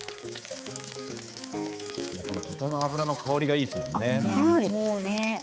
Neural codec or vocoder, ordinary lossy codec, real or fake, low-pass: codec, 16 kHz, 4 kbps, X-Codec, HuBERT features, trained on balanced general audio; none; fake; none